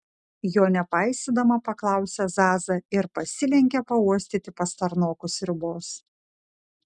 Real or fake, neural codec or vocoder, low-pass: real; none; 10.8 kHz